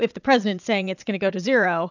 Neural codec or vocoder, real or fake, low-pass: vocoder, 22.05 kHz, 80 mel bands, Vocos; fake; 7.2 kHz